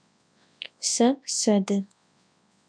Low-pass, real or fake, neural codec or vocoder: 9.9 kHz; fake; codec, 24 kHz, 0.9 kbps, WavTokenizer, large speech release